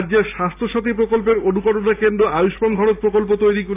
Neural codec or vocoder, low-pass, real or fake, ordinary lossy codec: none; 3.6 kHz; real; AAC, 24 kbps